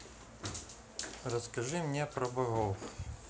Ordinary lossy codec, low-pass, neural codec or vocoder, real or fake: none; none; none; real